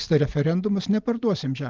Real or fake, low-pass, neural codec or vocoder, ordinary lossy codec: real; 7.2 kHz; none; Opus, 32 kbps